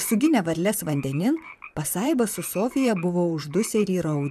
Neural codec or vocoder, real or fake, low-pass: vocoder, 44.1 kHz, 128 mel bands, Pupu-Vocoder; fake; 14.4 kHz